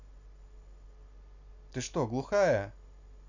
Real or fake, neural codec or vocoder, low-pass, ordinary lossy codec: real; none; 7.2 kHz; none